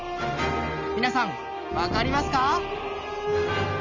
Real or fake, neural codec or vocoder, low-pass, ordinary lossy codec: real; none; 7.2 kHz; none